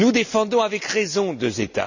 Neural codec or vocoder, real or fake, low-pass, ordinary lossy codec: none; real; 7.2 kHz; none